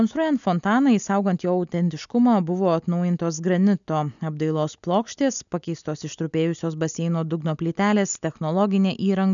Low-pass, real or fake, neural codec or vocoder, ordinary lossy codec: 7.2 kHz; real; none; MP3, 96 kbps